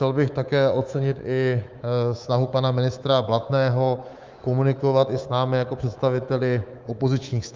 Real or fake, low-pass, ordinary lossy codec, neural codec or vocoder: fake; 7.2 kHz; Opus, 32 kbps; codec, 24 kHz, 3.1 kbps, DualCodec